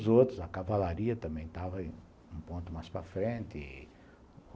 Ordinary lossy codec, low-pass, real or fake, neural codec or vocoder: none; none; real; none